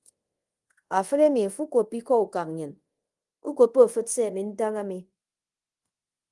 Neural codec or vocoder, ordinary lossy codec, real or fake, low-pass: codec, 24 kHz, 0.5 kbps, DualCodec; Opus, 24 kbps; fake; 10.8 kHz